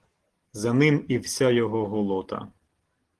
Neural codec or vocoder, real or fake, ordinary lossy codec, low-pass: none; real; Opus, 16 kbps; 10.8 kHz